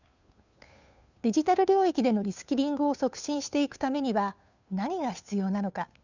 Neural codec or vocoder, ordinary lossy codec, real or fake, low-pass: codec, 16 kHz, 2 kbps, FunCodec, trained on Chinese and English, 25 frames a second; none; fake; 7.2 kHz